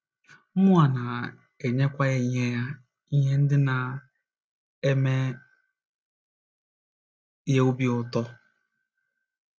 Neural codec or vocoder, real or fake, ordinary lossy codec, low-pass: none; real; none; none